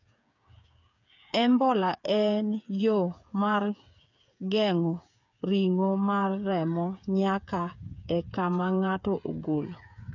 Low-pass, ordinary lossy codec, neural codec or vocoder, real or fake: 7.2 kHz; none; codec, 16 kHz, 8 kbps, FreqCodec, smaller model; fake